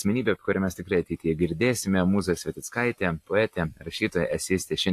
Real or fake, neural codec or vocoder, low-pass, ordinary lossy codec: real; none; 14.4 kHz; AAC, 64 kbps